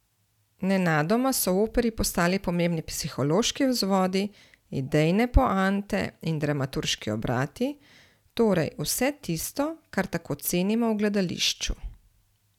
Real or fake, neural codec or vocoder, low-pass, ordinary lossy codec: real; none; 19.8 kHz; none